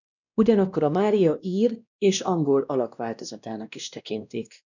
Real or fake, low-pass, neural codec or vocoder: fake; 7.2 kHz; codec, 16 kHz, 1 kbps, X-Codec, WavLM features, trained on Multilingual LibriSpeech